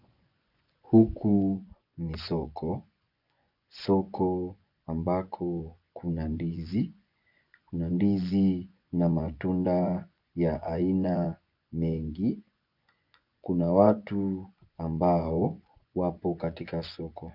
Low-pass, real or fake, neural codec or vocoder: 5.4 kHz; fake; vocoder, 24 kHz, 100 mel bands, Vocos